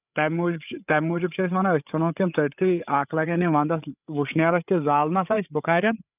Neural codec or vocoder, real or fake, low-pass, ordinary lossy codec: codec, 16 kHz, 8 kbps, FreqCodec, larger model; fake; 3.6 kHz; none